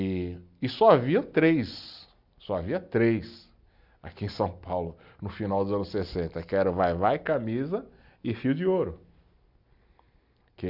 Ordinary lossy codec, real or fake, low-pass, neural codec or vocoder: Opus, 64 kbps; real; 5.4 kHz; none